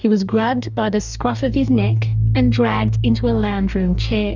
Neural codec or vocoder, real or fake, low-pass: codec, 44.1 kHz, 2.6 kbps, DAC; fake; 7.2 kHz